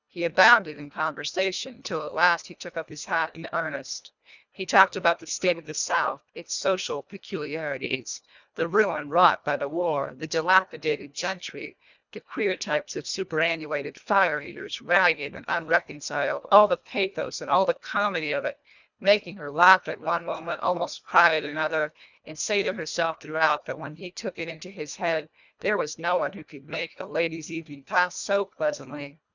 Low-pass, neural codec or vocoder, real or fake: 7.2 kHz; codec, 24 kHz, 1.5 kbps, HILCodec; fake